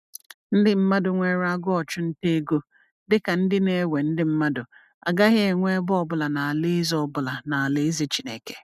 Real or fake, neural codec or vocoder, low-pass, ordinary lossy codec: real; none; 14.4 kHz; none